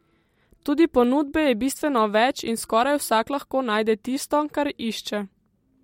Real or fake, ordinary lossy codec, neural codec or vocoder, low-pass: real; MP3, 64 kbps; none; 19.8 kHz